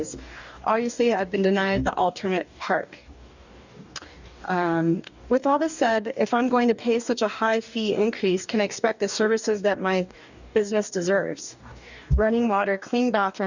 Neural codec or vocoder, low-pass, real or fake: codec, 44.1 kHz, 2.6 kbps, DAC; 7.2 kHz; fake